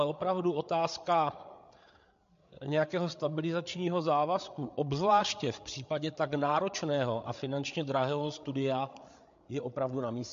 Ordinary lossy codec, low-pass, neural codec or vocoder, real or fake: MP3, 48 kbps; 7.2 kHz; codec, 16 kHz, 8 kbps, FreqCodec, larger model; fake